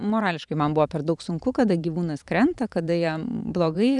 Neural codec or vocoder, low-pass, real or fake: vocoder, 44.1 kHz, 128 mel bands every 512 samples, BigVGAN v2; 10.8 kHz; fake